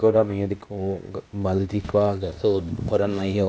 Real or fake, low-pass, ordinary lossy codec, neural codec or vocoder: fake; none; none; codec, 16 kHz, 0.8 kbps, ZipCodec